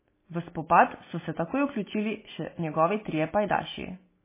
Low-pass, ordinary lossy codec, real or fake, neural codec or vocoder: 3.6 kHz; MP3, 16 kbps; real; none